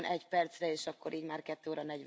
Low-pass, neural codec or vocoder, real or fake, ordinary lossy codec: none; none; real; none